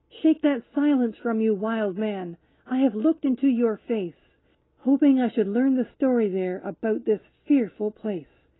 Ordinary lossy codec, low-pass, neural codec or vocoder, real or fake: AAC, 16 kbps; 7.2 kHz; none; real